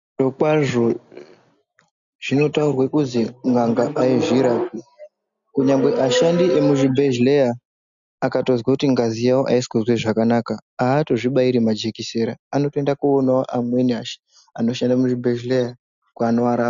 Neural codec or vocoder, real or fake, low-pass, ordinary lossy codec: none; real; 7.2 kHz; MP3, 96 kbps